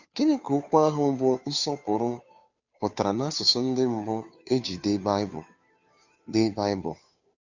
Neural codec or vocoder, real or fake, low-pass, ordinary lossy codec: codec, 16 kHz, 2 kbps, FunCodec, trained on Chinese and English, 25 frames a second; fake; 7.2 kHz; none